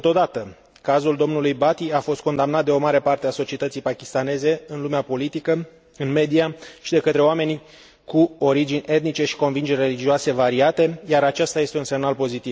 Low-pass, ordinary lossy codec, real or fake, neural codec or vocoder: none; none; real; none